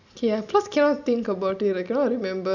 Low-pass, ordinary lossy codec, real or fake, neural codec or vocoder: 7.2 kHz; none; real; none